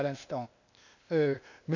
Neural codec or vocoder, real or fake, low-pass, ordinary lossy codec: codec, 16 kHz, 0.8 kbps, ZipCodec; fake; 7.2 kHz; none